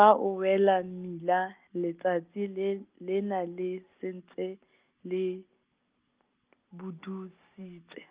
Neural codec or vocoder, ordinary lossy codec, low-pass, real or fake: none; Opus, 32 kbps; 3.6 kHz; real